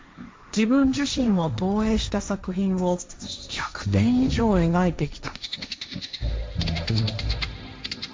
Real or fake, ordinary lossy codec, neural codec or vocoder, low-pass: fake; none; codec, 16 kHz, 1.1 kbps, Voila-Tokenizer; none